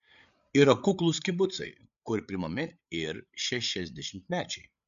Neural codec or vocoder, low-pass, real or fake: codec, 16 kHz, 8 kbps, FreqCodec, larger model; 7.2 kHz; fake